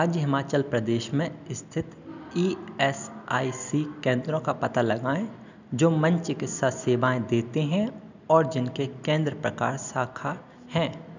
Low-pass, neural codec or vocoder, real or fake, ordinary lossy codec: 7.2 kHz; none; real; none